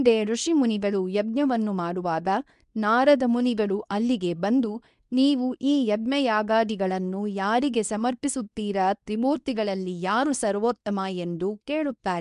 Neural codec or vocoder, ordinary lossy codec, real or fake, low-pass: codec, 24 kHz, 0.9 kbps, WavTokenizer, medium speech release version 2; none; fake; 10.8 kHz